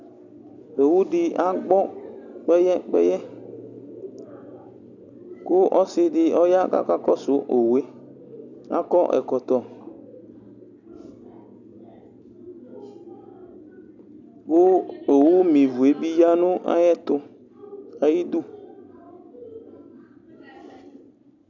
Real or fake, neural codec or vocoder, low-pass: real; none; 7.2 kHz